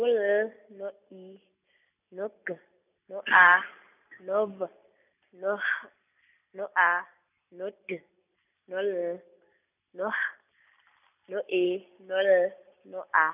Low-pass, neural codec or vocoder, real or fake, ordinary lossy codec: 3.6 kHz; none; real; none